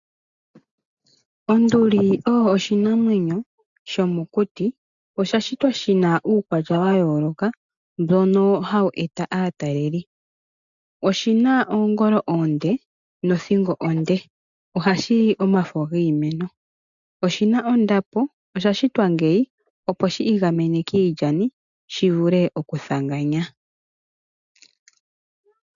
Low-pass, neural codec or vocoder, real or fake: 7.2 kHz; none; real